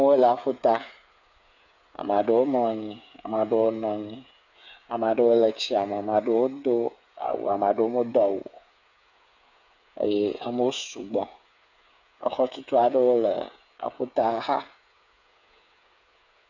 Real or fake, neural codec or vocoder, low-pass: fake; codec, 16 kHz, 8 kbps, FreqCodec, smaller model; 7.2 kHz